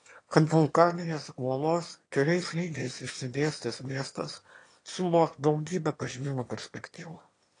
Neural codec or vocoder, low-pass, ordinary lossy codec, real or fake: autoencoder, 22.05 kHz, a latent of 192 numbers a frame, VITS, trained on one speaker; 9.9 kHz; AAC, 48 kbps; fake